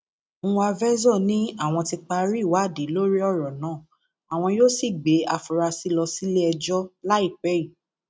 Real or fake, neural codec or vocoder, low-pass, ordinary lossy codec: real; none; none; none